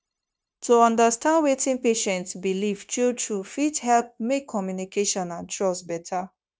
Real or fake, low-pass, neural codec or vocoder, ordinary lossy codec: fake; none; codec, 16 kHz, 0.9 kbps, LongCat-Audio-Codec; none